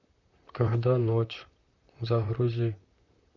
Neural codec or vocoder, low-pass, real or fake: vocoder, 44.1 kHz, 128 mel bands, Pupu-Vocoder; 7.2 kHz; fake